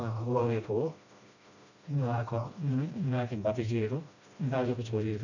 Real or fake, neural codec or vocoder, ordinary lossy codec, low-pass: fake; codec, 16 kHz, 1 kbps, FreqCodec, smaller model; none; 7.2 kHz